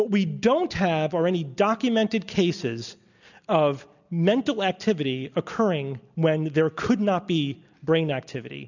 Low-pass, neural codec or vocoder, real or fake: 7.2 kHz; none; real